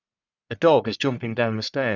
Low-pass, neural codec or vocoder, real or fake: 7.2 kHz; codec, 44.1 kHz, 1.7 kbps, Pupu-Codec; fake